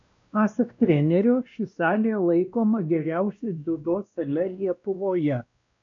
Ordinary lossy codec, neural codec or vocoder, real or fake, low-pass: AAC, 64 kbps; codec, 16 kHz, 1 kbps, X-Codec, WavLM features, trained on Multilingual LibriSpeech; fake; 7.2 kHz